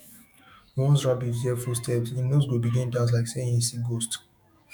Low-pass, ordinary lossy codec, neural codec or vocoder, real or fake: 19.8 kHz; none; autoencoder, 48 kHz, 128 numbers a frame, DAC-VAE, trained on Japanese speech; fake